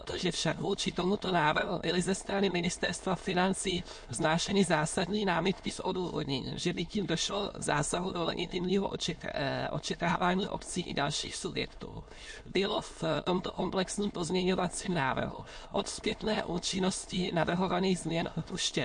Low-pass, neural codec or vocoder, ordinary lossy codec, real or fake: 9.9 kHz; autoencoder, 22.05 kHz, a latent of 192 numbers a frame, VITS, trained on many speakers; MP3, 48 kbps; fake